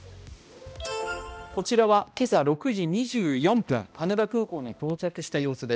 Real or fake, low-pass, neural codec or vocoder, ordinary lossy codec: fake; none; codec, 16 kHz, 1 kbps, X-Codec, HuBERT features, trained on balanced general audio; none